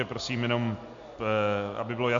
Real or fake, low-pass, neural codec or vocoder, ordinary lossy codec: real; 7.2 kHz; none; MP3, 48 kbps